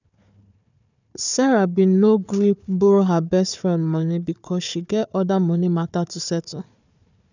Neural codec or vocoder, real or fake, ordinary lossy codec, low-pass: codec, 16 kHz, 4 kbps, FunCodec, trained on Chinese and English, 50 frames a second; fake; none; 7.2 kHz